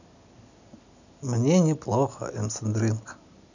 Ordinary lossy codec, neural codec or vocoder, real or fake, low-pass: none; none; real; 7.2 kHz